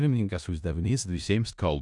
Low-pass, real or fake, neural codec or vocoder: 10.8 kHz; fake; codec, 16 kHz in and 24 kHz out, 0.4 kbps, LongCat-Audio-Codec, four codebook decoder